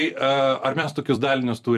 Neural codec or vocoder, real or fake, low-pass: vocoder, 44.1 kHz, 128 mel bands every 256 samples, BigVGAN v2; fake; 14.4 kHz